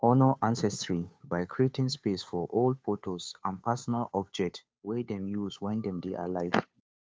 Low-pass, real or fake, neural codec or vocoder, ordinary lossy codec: none; fake; codec, 16 kHz, 2 kbps, FunCodec, trained on Chinese and English, 25 frames a second; none